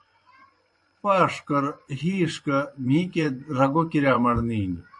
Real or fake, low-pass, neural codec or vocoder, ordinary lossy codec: fake; 10.8 kHz; vocoder, 24 kHz, 100 mel bands, Vocos; MP3, 48 kbps